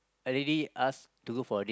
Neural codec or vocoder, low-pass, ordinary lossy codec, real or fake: none; none; none; real